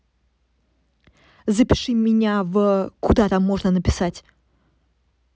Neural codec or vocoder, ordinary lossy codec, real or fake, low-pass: none; none; real; none